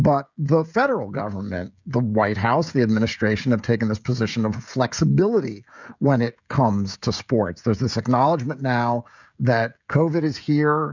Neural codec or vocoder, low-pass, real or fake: codec, 16 kHz, 16 kbps, FreqCodec, smaller model; 7.2 kHz; fake